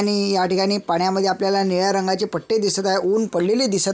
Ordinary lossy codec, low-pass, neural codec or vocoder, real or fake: none; none; none; real